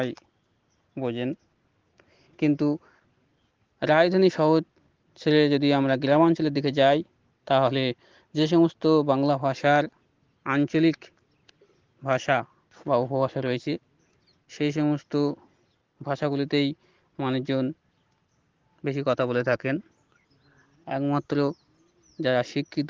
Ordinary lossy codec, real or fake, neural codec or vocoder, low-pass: Opus, 16 kbps; real; none; 7.2 kHz